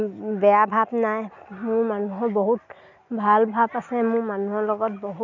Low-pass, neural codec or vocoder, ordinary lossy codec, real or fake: 7.2 kHz; none; none; real